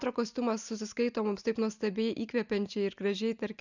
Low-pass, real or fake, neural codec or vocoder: 7.2 kHz; real; none